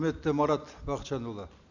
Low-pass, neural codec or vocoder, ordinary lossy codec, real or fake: 7.2 kHz; none; none; real